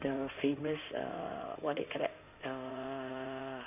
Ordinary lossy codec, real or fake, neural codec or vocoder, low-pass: none; fake; codec, 16 kHz in and 24 kHz out, 2.2 kbps, FireRedTTS-2 codec; 3.6 kHz